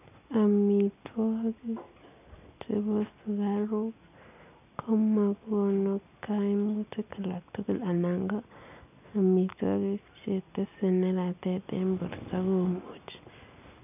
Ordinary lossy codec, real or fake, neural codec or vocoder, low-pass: none; real; none; 3.6 kHz